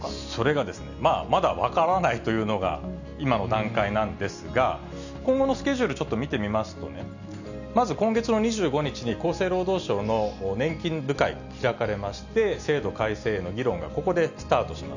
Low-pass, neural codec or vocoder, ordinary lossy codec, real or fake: 7.2 kHz; none; none; real